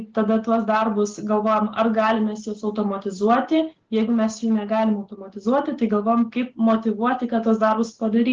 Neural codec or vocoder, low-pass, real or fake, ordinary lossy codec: none; 7.2 kHz; real; Opus, 16 kbps